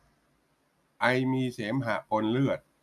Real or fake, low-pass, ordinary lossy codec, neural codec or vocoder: real; 14.4 kHz; none; none